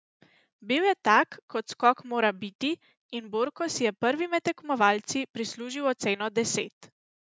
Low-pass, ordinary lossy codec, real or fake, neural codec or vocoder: none; none; real; none